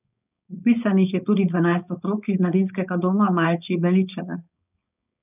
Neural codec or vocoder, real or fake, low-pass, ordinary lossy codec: codec, 16 kHz, 4.8 kbps, FACodec; fake; 3.6 kHz; none